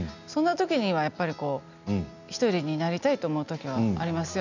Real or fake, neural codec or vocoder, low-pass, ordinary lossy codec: real; none; 7.2 kHz; none